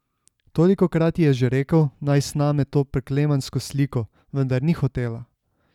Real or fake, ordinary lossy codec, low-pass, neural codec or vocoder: real; none; 19.8 kHz; none